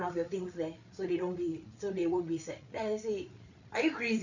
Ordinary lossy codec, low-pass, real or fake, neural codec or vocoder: none; 7.2 kHz; fake; codec, 16 kHz, 8 kbps, FreqCodec, larger model